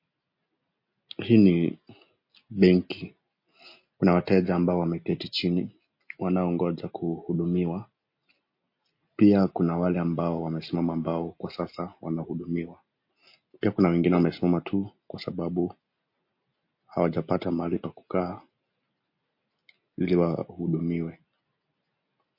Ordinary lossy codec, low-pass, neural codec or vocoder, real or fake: MP3, 32 kbps; 5.4 kHz; none; real